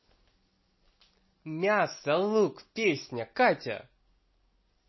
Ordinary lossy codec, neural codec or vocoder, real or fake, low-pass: MP3, 24 kbps; none; real; 7.2 kHz